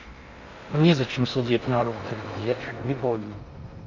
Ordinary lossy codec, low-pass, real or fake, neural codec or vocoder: Opus, 64 kbps; 7.2 kHz; fake; codec, 16 kHz in and 24 kHz out, 0.6 kbps, FocalCodec, streaming, 2048 codes